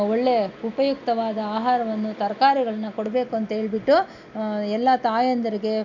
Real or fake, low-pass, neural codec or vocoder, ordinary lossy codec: real; 7.2 kHz; none; none